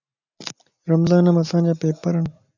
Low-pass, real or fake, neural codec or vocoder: 7.2 kHz; real; none